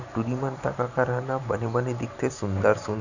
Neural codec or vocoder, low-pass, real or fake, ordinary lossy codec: vocoder, 44.1 kHz, 128 mel bands every 512 samples, BigVGAN v2; 7.2 kHz; fake; none